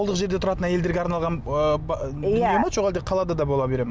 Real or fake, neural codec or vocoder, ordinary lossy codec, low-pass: real; none; none; none